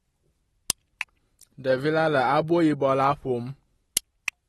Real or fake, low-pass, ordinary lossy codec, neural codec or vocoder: real; 19.8 kHz; AAC, 32 kbps; none